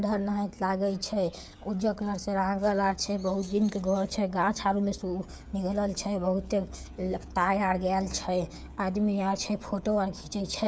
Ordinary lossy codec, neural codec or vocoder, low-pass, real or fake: none; codec, 16 kHz, 16 kbps, FreqCodec, smaller model; none; fake